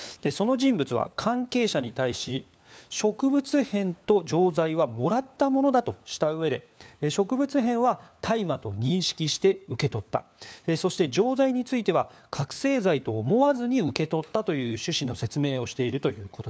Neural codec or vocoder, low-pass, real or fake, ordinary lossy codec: codec, 16 kHz, 4 kbps, FunCodec, trained on LibriTTS, 50 frames a second; none; fake; none